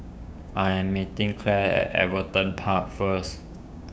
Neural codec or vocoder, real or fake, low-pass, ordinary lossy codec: codec, 16 kHz, 6 kbps, DAC; fake; none; none